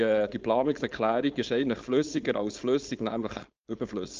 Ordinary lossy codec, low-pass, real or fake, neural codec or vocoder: Opus, 24 kbps; 7.2 kHz; fake; codec, 16 kHz, 4.8 kbps, FACodec